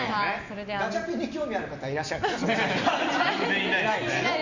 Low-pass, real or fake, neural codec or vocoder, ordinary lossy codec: 7.2 kHz; real; none; Opus, 64 kbps